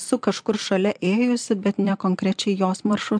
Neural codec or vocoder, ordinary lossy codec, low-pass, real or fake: vocoder, 44.1 kHz, 128 mel bands every 256 samples, BigVGAN v2; MP3, 64 kbps; 9.9 kHz; fake